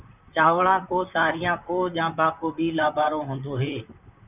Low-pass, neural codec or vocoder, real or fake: 3.6 kHz; vocoder, 44.1 kHz, 128 mel bands, Pupu-Vocoder; fake